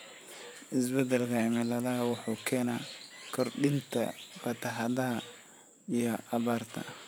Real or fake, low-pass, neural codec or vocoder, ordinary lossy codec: fake; none; vocoder, 44.1 kHz, 128 mel bands every 512 samples, BigVGAN v2; none